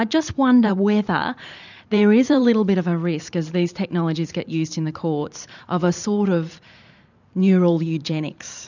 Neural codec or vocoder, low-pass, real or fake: vocoder, 44.1 kHz, 128 mel bands every 512 samples, BigVGAN v2; 7.2 kHz; fake